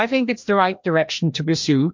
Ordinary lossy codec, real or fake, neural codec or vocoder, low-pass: MP3, 48 kbps; fake; codec, 16 kHz, 1 kbps, FreqCodec, larger model; 7.2 kHz